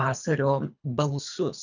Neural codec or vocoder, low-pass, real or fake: codec, 24 kHz, 3 kbps, HILCodec; 7.2 kHz; fake